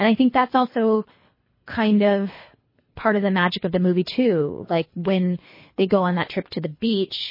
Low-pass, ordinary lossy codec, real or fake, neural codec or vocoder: 5.4 kHz; MP3, 24 kbps; fake; codec, 24 kHz, 3 kbps, HILCodec